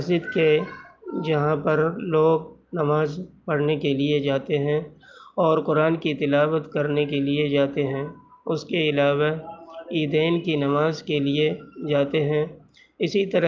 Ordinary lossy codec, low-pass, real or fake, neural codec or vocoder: Opus, 24 kbps; 7.2 kHz; real; none